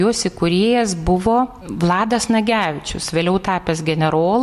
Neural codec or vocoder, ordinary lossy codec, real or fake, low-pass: none; MP3, 64 kbps; real; 14.4 kHz